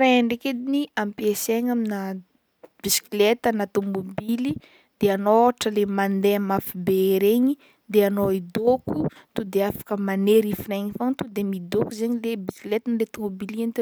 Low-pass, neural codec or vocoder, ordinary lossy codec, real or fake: none; none; none; real